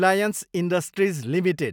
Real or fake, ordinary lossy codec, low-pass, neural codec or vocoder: real; none; none; none